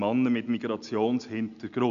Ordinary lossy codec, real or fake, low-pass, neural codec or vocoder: none; real; 7.2 kHz; none